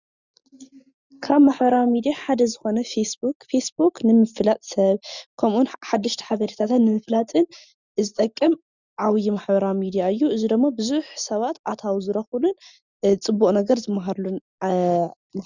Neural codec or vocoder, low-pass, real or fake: none; 7.2 kHz; real